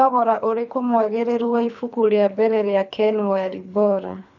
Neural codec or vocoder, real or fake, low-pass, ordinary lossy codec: codec, 24 kHz, 3 kbps, HILCodec; fake; 7.2 kHz; none